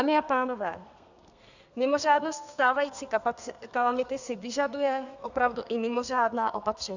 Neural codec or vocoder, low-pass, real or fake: codec, 32 kHz, 1.9 kbps, SNAC; 7.2 kHz; fake